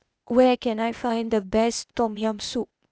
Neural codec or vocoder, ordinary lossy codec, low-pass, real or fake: codec, 16 kHz, 0.8 kbps, ZipCodec; none; none; fake